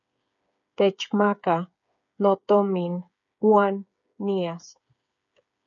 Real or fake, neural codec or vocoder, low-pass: fake; codec, 16 kHz, 8 kbps, FreqCodec, smaller model; 7.2 kHz